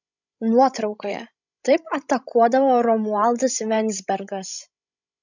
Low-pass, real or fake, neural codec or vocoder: 7.2 kHz; fake; codec, 16 kHz, 16 kbps, FreqCodec, larger model